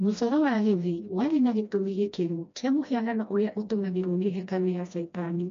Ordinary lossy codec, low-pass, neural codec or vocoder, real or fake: MP3, 48 kbps; 7.2 kHz; codec, 16 kHz, 1 kbps, FreqCodec, smaller model; fake